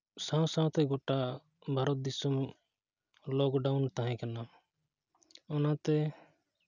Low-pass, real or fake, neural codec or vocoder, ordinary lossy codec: 7.2 kHz; real; none; none